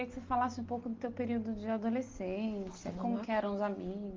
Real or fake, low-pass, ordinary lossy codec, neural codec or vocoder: real; 7.2 kHz; Opus, 32 kbps; none